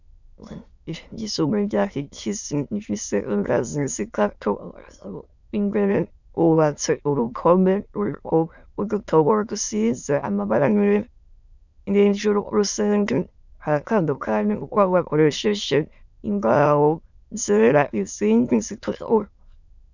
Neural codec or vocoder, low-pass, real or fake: autoencoder, 22.05 kHz, a latent of 192 numbers a frame, VITS, trained on many speakers; 7.2 kHz; fake